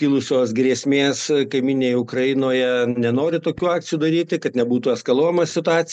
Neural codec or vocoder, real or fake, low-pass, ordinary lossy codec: none; real; 9.9 kHz; MP3, 96 kbps